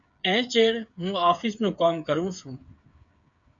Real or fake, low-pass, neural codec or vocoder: fake; 7.2 kHz; codec, 16 kHz, 8 kbps, FreqCodec, smaller model